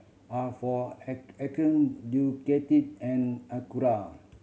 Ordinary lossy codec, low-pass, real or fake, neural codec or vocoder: none; none; real; none